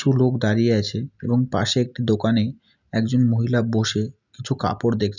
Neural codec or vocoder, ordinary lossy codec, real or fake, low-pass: none; none; real; 7.2 kHz